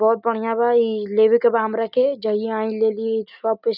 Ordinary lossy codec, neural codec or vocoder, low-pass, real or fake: none; none; 5.4 kHz; real